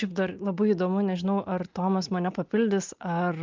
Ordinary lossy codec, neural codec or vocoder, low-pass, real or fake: Opus, 24 kbps; none; 7.2 kHz; real